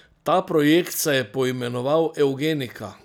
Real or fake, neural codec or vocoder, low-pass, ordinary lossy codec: real; none; none; none